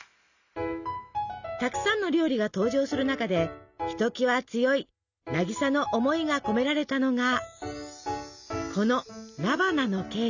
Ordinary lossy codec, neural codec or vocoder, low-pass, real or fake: none; none; 7.2 kHz; real